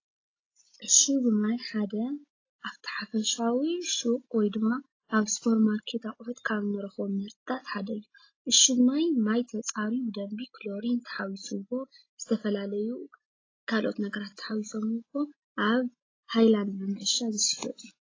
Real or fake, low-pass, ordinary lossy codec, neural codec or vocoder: real; 7.2 kHz; AAC, 32 kbps; none